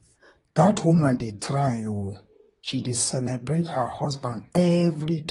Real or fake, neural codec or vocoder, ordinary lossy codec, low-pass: fake; codec, 24 kHz, 1 kbps, SNAC; AAC, 32 kbps; 10.8 kHz